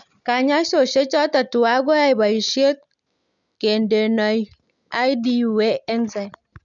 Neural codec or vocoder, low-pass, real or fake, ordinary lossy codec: none; 7.2 kHz; real; none